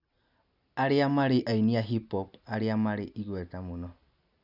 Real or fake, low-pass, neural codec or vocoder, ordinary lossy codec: real; 5.4 kHz; none; none